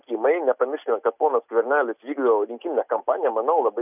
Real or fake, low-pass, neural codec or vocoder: real; 3.6 kHz; none